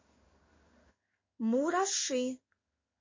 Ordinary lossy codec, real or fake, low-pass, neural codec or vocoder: MP3, 32 kbps; fake; 7.2 kHz; codec, 16 kHz in and 24 kHz out, 1 kbps, XY-Tokenizer